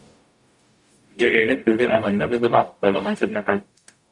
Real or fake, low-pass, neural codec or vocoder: fake; 10.8 kHz; codec, 44.1 kHz, 0.9 kbps, DAC